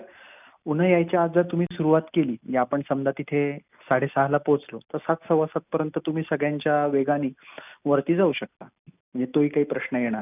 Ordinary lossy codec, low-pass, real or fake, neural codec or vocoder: none; 3.6 kHz; real; none